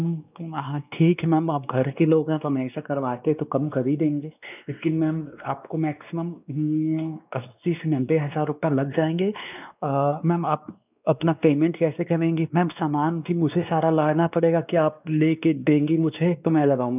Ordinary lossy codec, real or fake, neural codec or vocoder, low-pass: none; fake; codec, 16 kHz, 2 kbps, X-Codec, WavLM features, trained on Multilingual LibriSpeech; 3.6 kHz